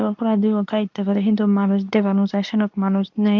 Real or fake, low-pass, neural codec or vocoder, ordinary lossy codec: fake; 7.2 kHz; codec, 24 kHz, 0.9 kbps, WavTokenizer, medium speech release version 2; MP3, 64 kbps